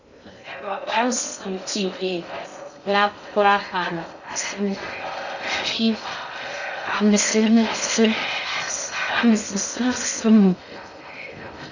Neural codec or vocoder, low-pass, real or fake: codec, 16 kHz in and 24 kHz out, 0.6 kbps, FocalCodec, streaming, 2048 codes; 7.2 kHz; fake